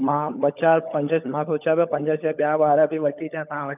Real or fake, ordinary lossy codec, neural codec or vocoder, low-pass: fake; none; codec, 16 kHz, 16 kbps, FunCodec, trained on LibriTTS, 50 frames a second; 3.6 kHz